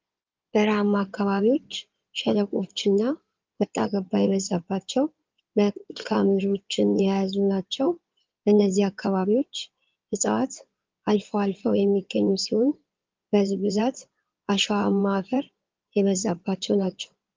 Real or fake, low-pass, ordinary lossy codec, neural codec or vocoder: fake; 7.2 kHz; Opus, 32 kbps; codec, 16 kHz in and 24 kHz out, 2.2 kbps, FireRedTTS-2 codec